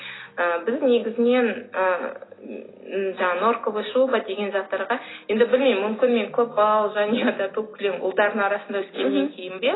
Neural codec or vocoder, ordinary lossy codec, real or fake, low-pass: none; AAC, 16 kbps; real; 7.2 kHz